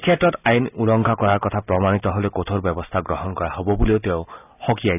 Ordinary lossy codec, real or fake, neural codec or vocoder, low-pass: none; real; none; 3.6 kHz